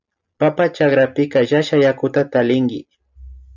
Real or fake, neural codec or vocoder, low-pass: real; none; 7.2 kHz